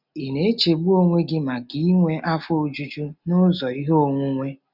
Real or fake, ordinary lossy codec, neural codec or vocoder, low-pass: real; none; none; 5.4 kHz